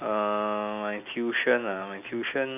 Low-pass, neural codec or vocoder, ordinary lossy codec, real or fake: 3.6 kHz; none; none; real